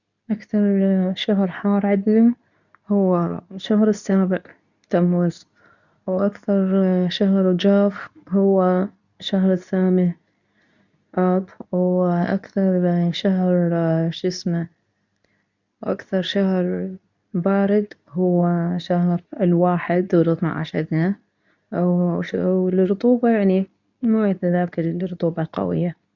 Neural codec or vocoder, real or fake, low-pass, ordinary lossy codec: codec, 24 kHz, 0.9 kbps, WavTokenizer, medium speech release version 2; fake; 7.2 kHz; none